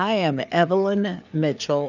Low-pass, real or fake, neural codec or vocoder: 7.2 kHz; fake; codec, 16 kHz, 4 kbps, FreqCodec, larger model